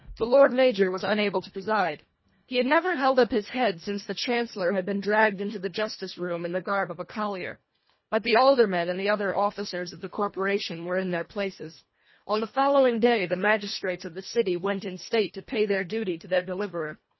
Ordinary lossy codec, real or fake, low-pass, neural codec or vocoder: MP3, 24 kbps; fake; 7.2 kHz; codec, 24 kHz, 1.5 kbps, HILCodec